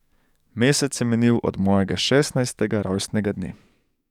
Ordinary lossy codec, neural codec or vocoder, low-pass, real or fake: none; codec, 44.1 kHz, 7.8 kbps, DAC; 19.8 kHz; fake